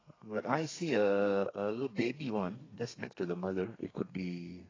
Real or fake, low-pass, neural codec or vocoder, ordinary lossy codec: fake; 7.2 kHz; codec, 32 kHz, 1.9 kbps, SNAC; AAC, 32 kbps